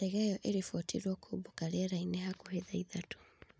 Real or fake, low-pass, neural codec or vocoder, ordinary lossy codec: real; none; none; none